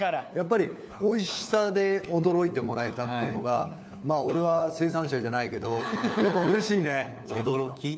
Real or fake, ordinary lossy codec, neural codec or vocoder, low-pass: fake; none; codec, 16 kHz, 4 kbps, FunCodec, trained on LibriTTS, 50 frames a second; none